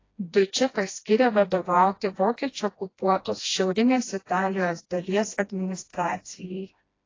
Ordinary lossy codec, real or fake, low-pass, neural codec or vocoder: AAC, 32 kbps; fake; 7.2 kHz; codec, 16 kHz, 1 kbps, FreqCodec, smaller model